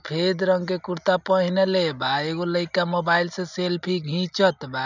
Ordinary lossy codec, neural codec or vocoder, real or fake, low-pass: none; none; real; 7.2 kHz